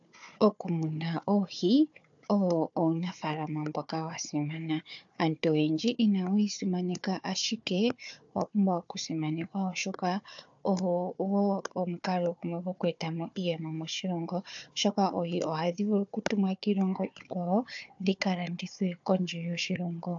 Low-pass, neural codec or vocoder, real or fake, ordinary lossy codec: 7.2 kHz; codec, 16 kHz, 4 kbps, FunCodec, trained on Chinese and English, 50 frames a second; fake; AAC, 64 kbps